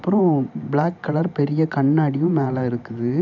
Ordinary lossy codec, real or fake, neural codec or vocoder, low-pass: none; fake; vocoder, 44.1 kHz, 128 mel bands every 512 samples, BigVGAN v2; 7.2 kHz